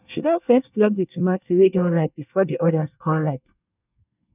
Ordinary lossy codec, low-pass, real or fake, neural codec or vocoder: none; 3.6 kHz; fake; codec, 24 kHz, 1 kbps, SNAC